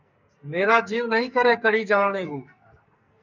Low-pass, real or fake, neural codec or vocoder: 7.2 kHz; fake; codec, 44.1 kHz, 2.6 kbps, SNAC